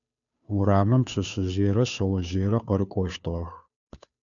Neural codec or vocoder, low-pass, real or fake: codec, 16 kHz, 2 kbps, FunCodec, trained on Chinese and English, 25 frames a second; 7.2 kHz; fake